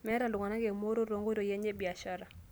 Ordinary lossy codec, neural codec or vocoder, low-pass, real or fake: none; none; none; real